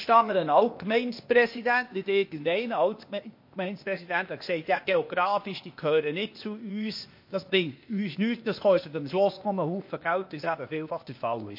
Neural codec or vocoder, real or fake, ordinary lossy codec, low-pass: codec, 16 kHz, 0.8 kbps, ZipCodec; fake; MP3, 32 kbps; 5.4 kHz